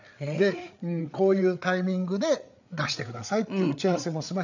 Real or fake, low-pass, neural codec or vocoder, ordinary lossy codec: fake; 7.2 kHz; codec, 16 kHz, 8 kbps, FreqCodec, larger model; none